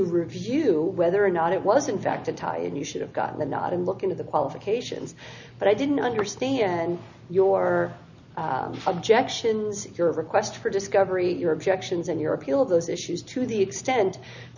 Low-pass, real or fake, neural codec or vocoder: 7.2 kHz; real; none